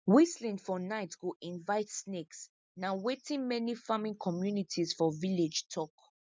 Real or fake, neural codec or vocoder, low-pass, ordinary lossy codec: real; none; none; none